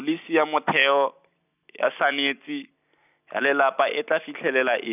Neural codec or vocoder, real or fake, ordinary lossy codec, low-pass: none; real; none; 3.6 kHz